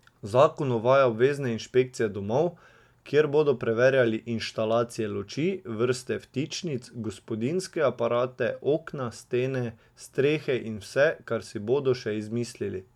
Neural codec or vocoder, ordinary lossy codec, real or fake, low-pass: none; MP3, 96 kbps; real; 19.8 kHz